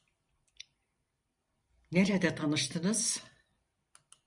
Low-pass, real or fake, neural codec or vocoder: 10.8 kHz; fake; vocoder, 44.1 kHz, 128 mel bands every 512 samples, BigVGAN v2